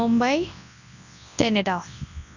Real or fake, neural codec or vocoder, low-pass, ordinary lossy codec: fake; codec, 24 kHz, 0.9 kbps, WavTokenizer, large speech release; 7.2 kHz; none